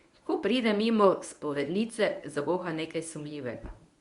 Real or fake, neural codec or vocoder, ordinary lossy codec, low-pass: fake; codec, 24 kHz, 0.9 kbps, WavTokenizer, medium speech release version 1; Opus, 64 kbps; 10.8 kHz